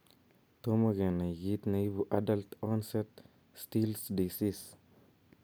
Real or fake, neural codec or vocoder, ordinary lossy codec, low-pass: real; none; none; none